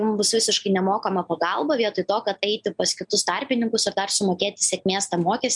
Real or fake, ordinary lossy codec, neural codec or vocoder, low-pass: real; MP3, 96 kbps; none; 10.8 kHz